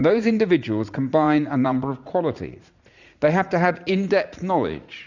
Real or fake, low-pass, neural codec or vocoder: fake; 7.2 kHz; vocoder, 44.1 kHz, 80 mel bands, Vocos